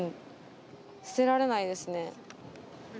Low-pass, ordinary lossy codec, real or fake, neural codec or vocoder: none; none; real; none